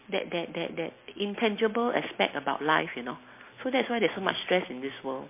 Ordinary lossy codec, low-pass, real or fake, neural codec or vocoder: MP3, 24 kbps; 3.6 kHz; real; none